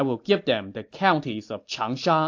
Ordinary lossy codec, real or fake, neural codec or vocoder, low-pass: AAC, 48 kbps; real; none; 7.2 kHz